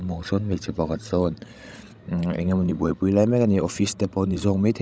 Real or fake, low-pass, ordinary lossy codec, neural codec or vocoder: fake; none; none; codec, 16 kHz, 16 kbps, FreqCodec, larger model